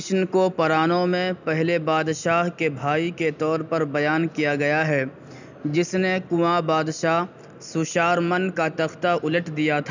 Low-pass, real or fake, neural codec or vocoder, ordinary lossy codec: 7.2 kHz; real; none; none